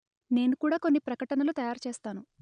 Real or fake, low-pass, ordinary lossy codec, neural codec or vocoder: real; 9.9 kHz; none; none